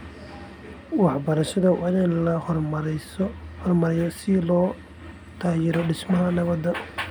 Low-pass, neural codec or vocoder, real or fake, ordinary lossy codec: none; none; real; none